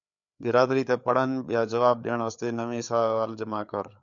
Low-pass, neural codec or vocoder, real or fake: 7.2 kHz; codec, 16 kHz, 4 kbps, FreqCodec, larger model; fake